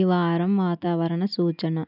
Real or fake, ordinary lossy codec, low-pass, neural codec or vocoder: real; none; 5.4 kHz; none